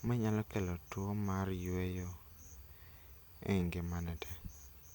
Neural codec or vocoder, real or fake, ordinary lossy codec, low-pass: none; real; none; none